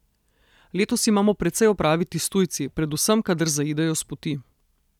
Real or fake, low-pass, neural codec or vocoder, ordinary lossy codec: real; 19.8 kHz; none; none